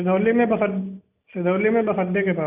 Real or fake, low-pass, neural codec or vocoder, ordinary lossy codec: real; 3.6 kHz; none; none